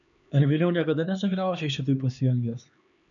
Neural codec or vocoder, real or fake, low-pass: codec, 16 kHz, 2 kbps, X-Codec, HuBERT features, trained on LibriSpeech; fake; 7.2 kHz